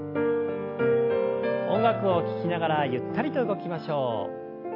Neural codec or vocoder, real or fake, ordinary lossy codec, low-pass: none; real; AAC, 32 kbps; 5.4 kHz